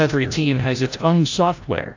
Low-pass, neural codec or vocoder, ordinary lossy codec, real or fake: 7.2 kHz; codec, 16 kHz, 0.5 kbps, FreqCodec, larger model; AAC, 48 kbps; fake